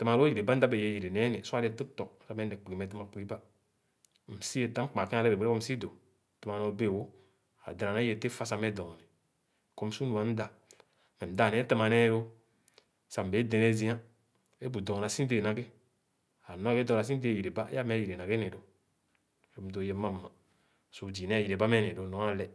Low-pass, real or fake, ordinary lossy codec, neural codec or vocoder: none; real; none; none